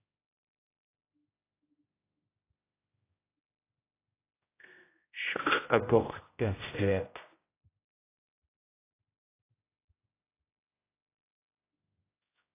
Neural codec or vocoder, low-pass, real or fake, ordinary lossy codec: codec, 16 kHz, 0.5 kbps, X-Codec, HuBERT features, trained on general audio; 3.6 kHz; fake; AAC, 24 kbps